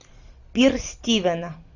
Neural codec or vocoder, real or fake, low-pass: none; real; 7.2 kHz